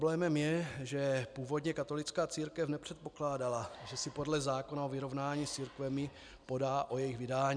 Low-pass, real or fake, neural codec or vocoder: 9.9 kHz; real; none